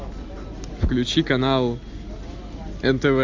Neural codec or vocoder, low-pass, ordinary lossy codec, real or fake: none; 7.2 kHz; MP3, 64 kbps; real